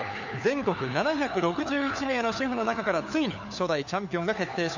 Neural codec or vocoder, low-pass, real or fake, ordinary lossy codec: codec, 16 kHz, 4 kbps, FunCodec, trained on LibriTTS, 50 frames a second; 7.2 kHz; fake; none